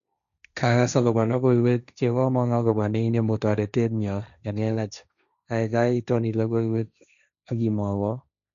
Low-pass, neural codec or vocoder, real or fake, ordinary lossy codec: 7.2 kHz; codec, 16 kHz, 1.1 kbps, Voila-Tokenizer; fake; none